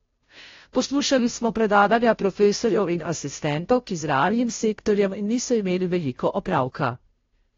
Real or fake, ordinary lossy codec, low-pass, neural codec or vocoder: fake; AAC, 32 kbps; 7.2 kHz; codec, 16 kHz, 0.5 kbps, FunCodec, trained on Chinese and English, 25 frames a second